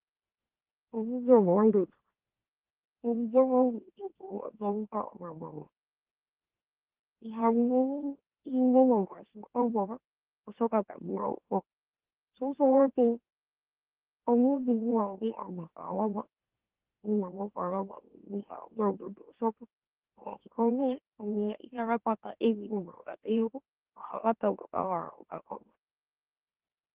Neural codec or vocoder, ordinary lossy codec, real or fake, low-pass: autoencoder, 44.1 kHz, a latent of 192 numbers a frame, MeloTTS; Opus, 16 kbps; fake; 3.6 kHz